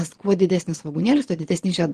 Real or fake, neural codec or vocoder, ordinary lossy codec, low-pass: fake; vocoder, 22.05 kHz, 80 mel bands, Vocos; Opus, 16 kbps; 9.9 kHz